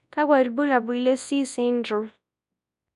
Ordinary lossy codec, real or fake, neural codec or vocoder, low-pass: none; fake; codec, 24 kHz, 0.9 kbps, WavTokenizer, large speech release; 10.8 kHz